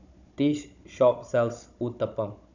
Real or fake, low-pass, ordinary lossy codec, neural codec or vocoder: fake; 7.2 kHz; none; codec, 16 kHz, 16 kbps, FunCodec, trained on Chinese and English, 50 frames a second